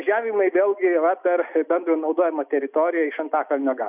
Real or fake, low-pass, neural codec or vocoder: real; 3.6 kHz; none